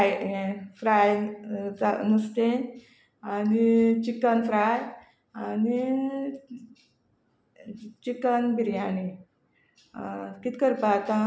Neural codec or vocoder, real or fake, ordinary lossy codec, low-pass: none; real; none; none